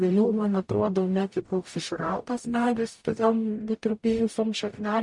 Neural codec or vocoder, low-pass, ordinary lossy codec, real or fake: codec, 44.1 kHz, 0.9 kbps, DAC; 10.8 kHz; MP3, 48 kbps; fake